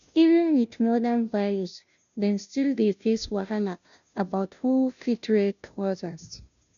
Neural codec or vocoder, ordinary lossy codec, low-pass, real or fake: codec, 16 kHz, 0.5 kbps, FunCodec, trained on Chinese and English, 25 frames a second; none; 7.2 kHz; fake